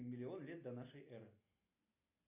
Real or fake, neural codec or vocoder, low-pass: real; none; 3.6 kHz